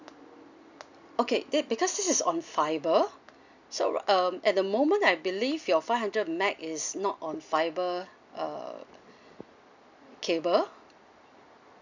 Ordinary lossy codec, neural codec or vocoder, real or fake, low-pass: none; none; real; 7.2 kHz